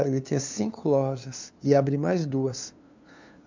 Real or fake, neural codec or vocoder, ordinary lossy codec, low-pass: fake; codec, 16 kHz, 2 kbps, FunCodec, trained on LibriTTS, 25 frames a second; MP3, 64 kbps; 7.2 kHz